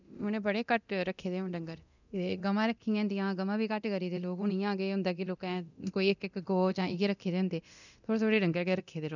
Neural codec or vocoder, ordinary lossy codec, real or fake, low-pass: codec, 24 kHz, 0.9 kbps, DualCodec; none; fake; 7.2 kHz